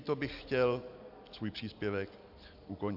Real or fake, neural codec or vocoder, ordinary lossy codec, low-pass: real; none; AAC, 48 kbps; 5.4 kHz